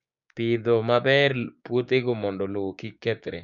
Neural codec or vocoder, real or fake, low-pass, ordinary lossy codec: codec, 16 kHz, 6 kbps, DAC; fake; 7.2 kHz; none